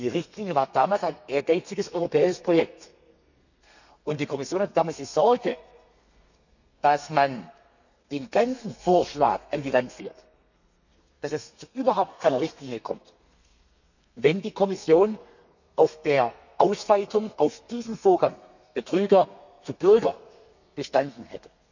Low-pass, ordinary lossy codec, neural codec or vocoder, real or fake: 7.2 kHz; none; codec, 32 kHz, 1.9 kbps, SNAC; fake